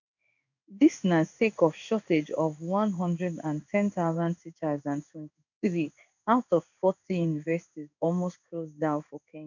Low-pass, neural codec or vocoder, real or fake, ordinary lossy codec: 7.2 kHz; codec, 16 kHz in and 24 kHz out, 1 kbps, XY-Tokenizer; fake; none